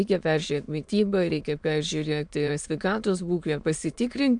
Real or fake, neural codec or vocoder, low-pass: fake; autoencoder, 22.05 kHz, a latent of 192 numbers a frame, VITS, trained on many speakers; 9.9 kHz